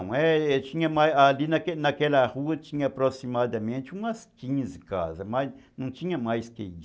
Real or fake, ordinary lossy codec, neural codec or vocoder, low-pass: real; none; none; none